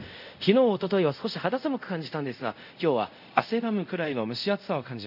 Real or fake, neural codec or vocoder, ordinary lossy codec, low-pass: fake; codec, 24 kHz, 0.5 kbps, DualCodec; none; 5.4 kHz